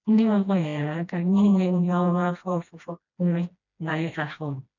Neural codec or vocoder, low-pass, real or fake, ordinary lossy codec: codec, 16 kHz, 1 kbps, FreqCodec, smaller model; 7.2 kHz; fake; none